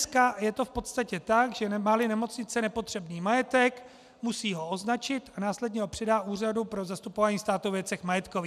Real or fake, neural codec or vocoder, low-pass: real; none; 14.4 kHz